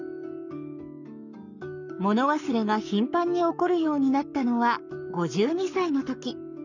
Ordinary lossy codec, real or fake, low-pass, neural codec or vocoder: none; fake; 7.2 kHz; codec, 44.1 kHz, 7.8 kbps, Pupu-Codec